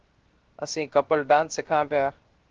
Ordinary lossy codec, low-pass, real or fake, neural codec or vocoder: Opus, 16 kbps; 7.2 kHz; fake; codec, 16 kHz, 0.7 kbps, FocalCodec